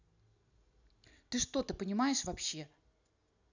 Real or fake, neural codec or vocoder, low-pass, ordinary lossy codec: real; none; 7.2 kHz; none